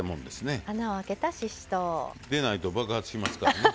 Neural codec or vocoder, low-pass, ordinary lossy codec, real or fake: none; none; none; real